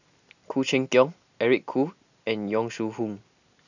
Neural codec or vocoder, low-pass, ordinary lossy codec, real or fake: none; 7.2 kHz; none; real